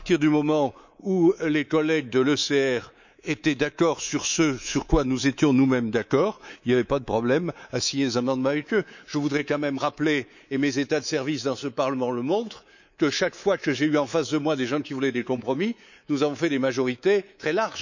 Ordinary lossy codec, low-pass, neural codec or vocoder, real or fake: none; 7.2 kHz; codec, 24 kHz, 3.1 kbps, DualCodec; fake